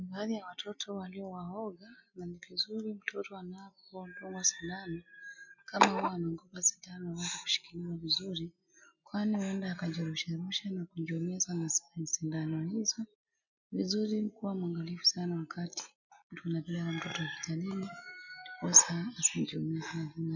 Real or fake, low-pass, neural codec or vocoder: real; 7.2 kHz; none